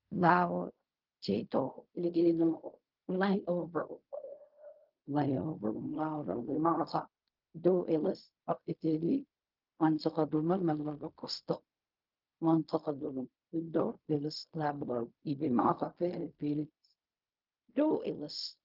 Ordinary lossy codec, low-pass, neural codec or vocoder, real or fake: Opus, 32 kbps; 5.4 kHz; codec, 16 kHz in and 24 kHz out, 0.4 kbps, LongCat-Audio-Codec, fine tuned four codebook decoder; fake